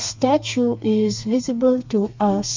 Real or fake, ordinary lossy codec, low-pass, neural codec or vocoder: fake; MP3, 64 kbps; 7.2 kHz; codec, 32 kHz, 1.9 kbps, SNAC